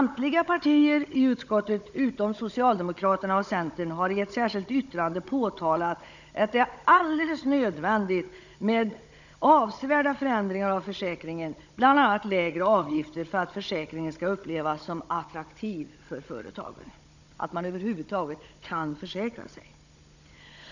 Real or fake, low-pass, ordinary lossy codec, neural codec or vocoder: fake; 7.2 kHz; none; codec, 16 kHz, 16 kbps, FunCodec, trained on Chinese and English, 50 frames a second